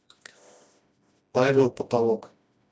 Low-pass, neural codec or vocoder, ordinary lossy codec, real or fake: none; codec, 16 kHz, 1 kbps, FreqCodec, smaller model; none; fake